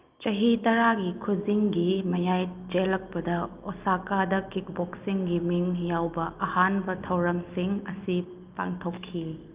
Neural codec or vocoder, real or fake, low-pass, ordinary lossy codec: none; real; 3.6 kHz; Opus, 24 kbps